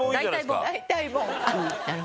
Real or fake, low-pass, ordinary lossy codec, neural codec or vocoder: real; none; none; none